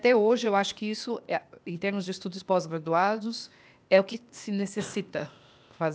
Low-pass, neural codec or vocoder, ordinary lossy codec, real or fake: none; codec, 16 kHz, 0.8 kbps, ZipCodec; none; fake